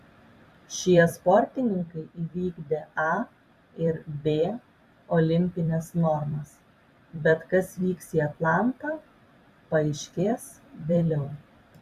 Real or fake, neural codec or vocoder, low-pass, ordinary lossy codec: fake; vocoder, 44.1 kHz, 128 mel bands every 256 samples, BigVGAN v2; 14.4 kHz; Opus, 64 kbps